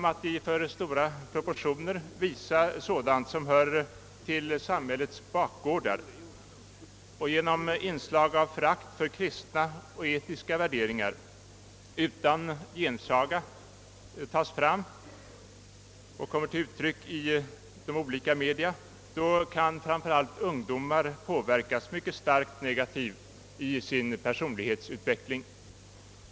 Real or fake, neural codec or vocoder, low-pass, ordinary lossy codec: real; none; none; none